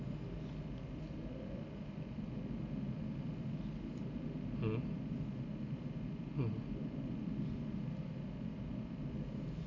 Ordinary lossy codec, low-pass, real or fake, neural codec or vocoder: AAC, 48 kbps; 7.2 kHz; real; none